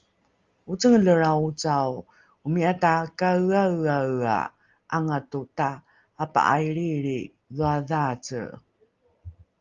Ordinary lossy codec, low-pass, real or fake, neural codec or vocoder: Opus, 32 kbps; 7.2 kHz; real; none